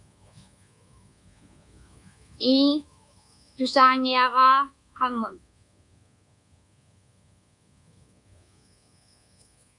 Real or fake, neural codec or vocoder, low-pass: fake; codec, 24 kHz, 1.2 kbps, DualCodec; 10.8 kHz